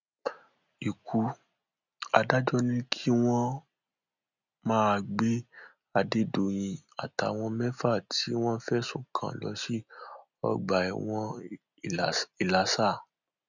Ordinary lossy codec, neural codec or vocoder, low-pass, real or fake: none; none; 7.2 kHz; real